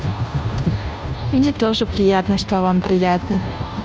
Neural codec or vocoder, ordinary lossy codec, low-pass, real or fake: codec, 16 kHz, 0.5 kbps, FunCodec, trained on Chinese and English, 25 frames a second; none; none; fake